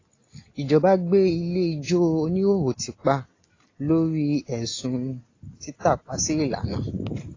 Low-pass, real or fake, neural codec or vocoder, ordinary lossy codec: 7.2 kHz; real; none; AAC, 32 kbps